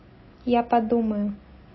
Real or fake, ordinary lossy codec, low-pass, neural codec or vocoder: real; MP3, 24 kbps; 7.2 kHz; none